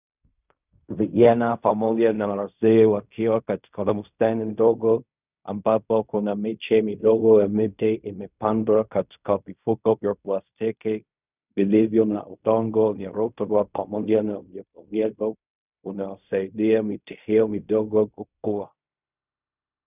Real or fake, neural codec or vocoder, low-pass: fake; codec, 16 kHz in and 24 kHz out, 0.4 kbps, LongCat-Audio-Codec, fine tuned four codebook decoder; 3.6 kHz